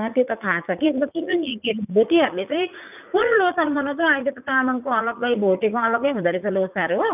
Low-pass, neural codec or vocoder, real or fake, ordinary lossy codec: 3.6 kHz; vocoder, 44.1 kHz, 80 mel bands, Vocos; fake; none